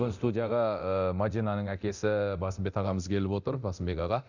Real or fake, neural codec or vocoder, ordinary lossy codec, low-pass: fake; codec, 24 kHz, 0.9 kbps, DualCodec; none; 7.2 kHz